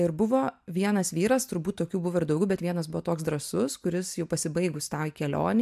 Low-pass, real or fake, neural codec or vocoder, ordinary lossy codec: 14.4 kHz; real; none; MP3, 96 kbps